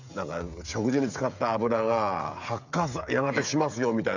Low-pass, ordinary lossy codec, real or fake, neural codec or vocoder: 7.2 kHz; none; fake; codec, 16 kHz, 16 kbps, FreqCodec, smaller model